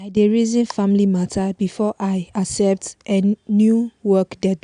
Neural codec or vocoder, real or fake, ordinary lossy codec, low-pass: none; real; AAC, 96 kbps; 9.9 kHz